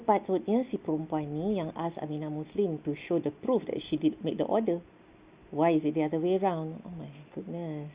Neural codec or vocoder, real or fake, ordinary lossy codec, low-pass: none; real; Opus, 64 kbps; 3.6 kHz